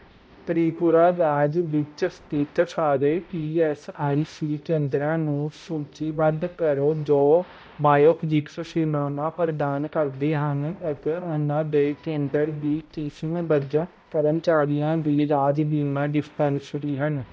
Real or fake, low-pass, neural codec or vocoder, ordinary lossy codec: fake; none; codec, 16 kHz, 0.5 kbps, X-Codec, HuBERT features, trained on balanced general audio; none